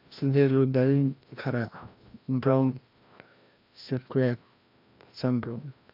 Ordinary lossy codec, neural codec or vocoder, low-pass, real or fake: MP3, 48 kbps; codec, 16 kHz, 1 kbps, FreqCodec, larger model; 5.4 kHz; fake